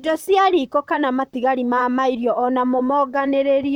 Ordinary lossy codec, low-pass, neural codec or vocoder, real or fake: none; 19.8 kHz; vocoder, 44.1 kHz, 128 mel bands, Pupu-Vocoder; fake